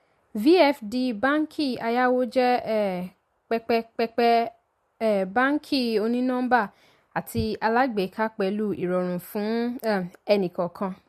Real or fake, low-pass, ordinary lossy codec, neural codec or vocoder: real; 14.4 kHz; MP3, 64 kbps; none